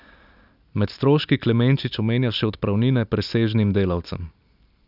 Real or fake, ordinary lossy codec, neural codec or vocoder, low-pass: real; none; none; 5.4 kHz